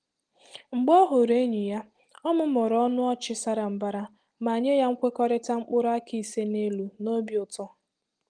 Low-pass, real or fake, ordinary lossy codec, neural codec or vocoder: 9.9 kHz; real; Opus, 24 kbps; none